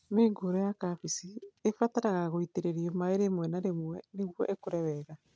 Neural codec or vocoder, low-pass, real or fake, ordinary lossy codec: none; none; real; none